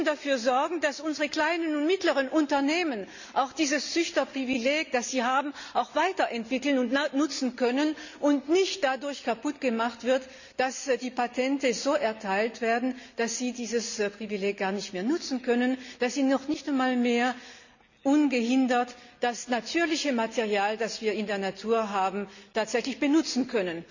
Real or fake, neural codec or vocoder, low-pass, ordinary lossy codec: real; none; 7.2 kHz; none